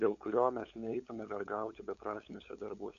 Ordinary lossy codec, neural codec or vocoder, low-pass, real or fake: MP3, 64 kbps; codec, 16 kHz, 8 kbps, FunCodec, trained on LibriTTS, 25 frames a second; 7.2 kHz; fake